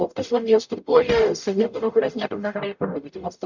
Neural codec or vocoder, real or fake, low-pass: codec, 44.1 kHz, 0.9 kbps, DAC; fake; 7.2 kHz